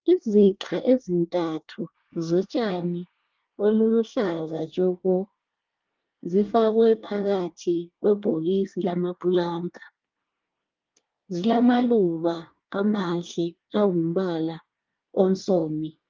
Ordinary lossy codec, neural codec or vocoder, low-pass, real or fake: Opus, 32 kbps; codec, 24 kHz, 1 kbps, SNAC; 7.2 kHz; fake